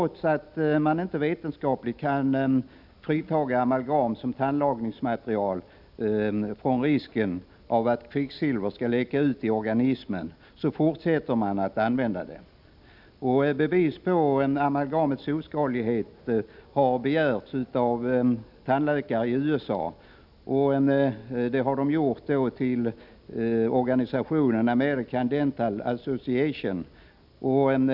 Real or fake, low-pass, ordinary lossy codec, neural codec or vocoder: real; 5.4 kHz; none; none